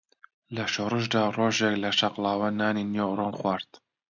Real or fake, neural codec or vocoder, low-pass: real; none; 7.2 kHz